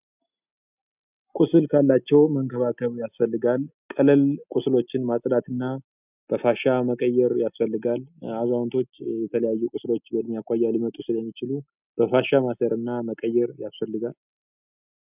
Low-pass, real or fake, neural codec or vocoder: 3.6 kHz; real; none